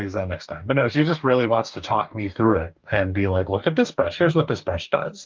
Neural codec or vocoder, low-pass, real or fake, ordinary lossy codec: codec, 44.1 kHz, 2.6 kbps, DAC; 7.2 kHz; fake; Opus, 32 kbps